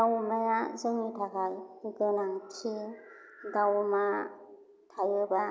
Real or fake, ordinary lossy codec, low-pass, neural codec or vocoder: real; none; none; none